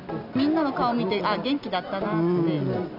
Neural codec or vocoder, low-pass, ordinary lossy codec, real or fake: none; 5.4 kHz; none; real